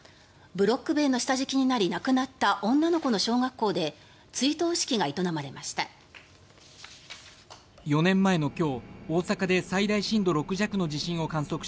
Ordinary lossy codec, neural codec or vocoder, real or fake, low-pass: none; none; real; none